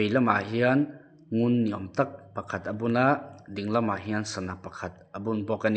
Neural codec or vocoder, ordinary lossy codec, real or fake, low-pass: none; none; real; none